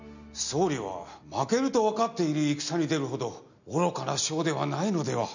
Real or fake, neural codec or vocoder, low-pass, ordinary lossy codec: real; none; 7.2 kHz; none